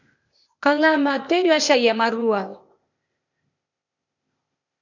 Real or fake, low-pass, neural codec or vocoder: fake; 7.2 kHz; codec, 16 kHz, 0.8 kbps, ZipCodec